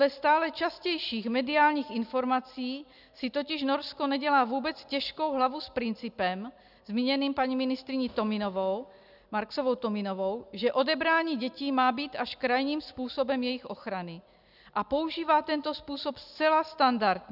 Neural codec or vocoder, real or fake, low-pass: none; real; 5.4 kHz